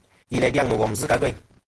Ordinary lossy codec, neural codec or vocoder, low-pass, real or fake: Opus, 16 kbps; vocoder, 48 kHz, 128 mel bands, Vocos; 10.8 kHz; fake